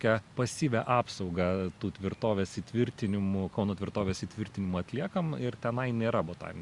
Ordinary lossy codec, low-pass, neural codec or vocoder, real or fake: Opus, 64 kbps; 10.8 kHz; none; real